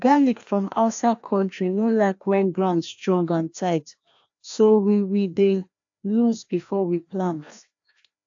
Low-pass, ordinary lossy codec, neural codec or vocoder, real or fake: 7.2 kHz; AAC, 64 kbps; codec, 16 kHz, 1 kbps, FreqCodec, larger model; fake